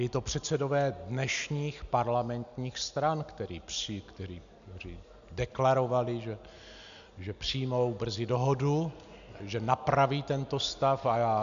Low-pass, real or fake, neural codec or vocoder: 7.2 kHz; real; none